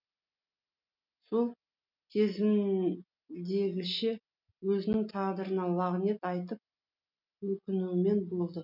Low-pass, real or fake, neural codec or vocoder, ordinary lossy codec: 5.4 kHz; real; none; none